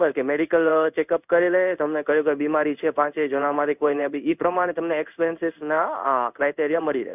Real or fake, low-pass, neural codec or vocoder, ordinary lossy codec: fake; 3.6 kHz; codec, 16 kHz in and 24 kHz out, 1 kbps, XY-Tokenizer; none